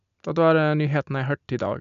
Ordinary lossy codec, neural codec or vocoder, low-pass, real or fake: none; none; 7.2 kHz; real